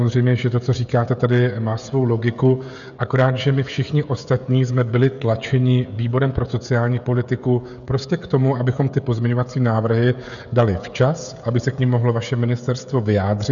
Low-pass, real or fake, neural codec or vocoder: 7.2 kHz; fake; codec, 16 kHz, 16 kbps, FreqCodec, smaller model